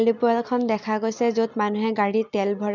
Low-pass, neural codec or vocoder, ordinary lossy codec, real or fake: 7.2 kHz; none; none; real